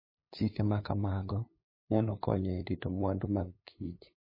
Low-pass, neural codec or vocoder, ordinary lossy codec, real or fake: 5.4 kHz; codec, 16 kHz, 8 kbps, FunCodec, trained on LibriTTS, 25 frames a second; MP3, 24 kbps; fake